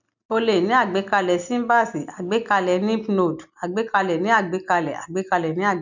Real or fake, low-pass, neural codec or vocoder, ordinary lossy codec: real; 7.2 kHz; none; none